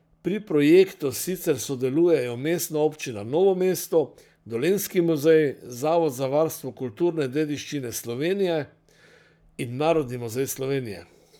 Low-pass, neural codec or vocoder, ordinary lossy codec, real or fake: none; codec, 44.1 kHz, 7.8 kbps, Pupu-Codec; none; fake